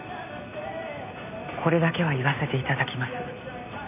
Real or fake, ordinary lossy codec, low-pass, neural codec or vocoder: real; none; 3.6 kHz; none